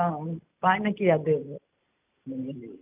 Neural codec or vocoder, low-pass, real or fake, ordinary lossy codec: none; 3.6 kHz; real; none